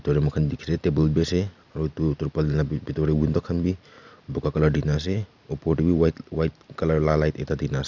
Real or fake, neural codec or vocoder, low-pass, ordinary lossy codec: real; none; 7.2 kHz; none